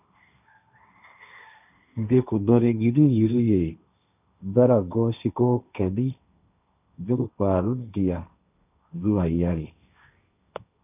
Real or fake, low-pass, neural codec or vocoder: fake; 3.6 kHz; codec, 16 kHz, 1.1 kbps, Voila-Tokenizer